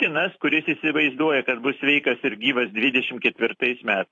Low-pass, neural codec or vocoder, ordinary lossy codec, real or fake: 10.8 kHz; vocoder, 44.1 kHz, 128 mel bands every 256 samples, BigVGAN v2; AAC, 48 kbps; fake